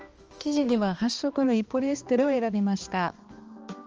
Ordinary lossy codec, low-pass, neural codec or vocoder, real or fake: Opus, 24 kbps; 7.2 kHz; codec, 16 kHz, 2 kbps, X-Codec, HuBERT features, trained on balanced general audio; fake